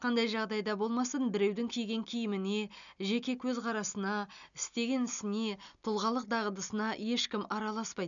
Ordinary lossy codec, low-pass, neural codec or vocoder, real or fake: none; 7.2 kHz; none; real